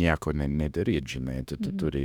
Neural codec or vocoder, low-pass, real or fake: autoencoder, 48 kHz, 32 numbers a frame, DAC-VAE, trained on Japanese speech; 19.8 kHz; fake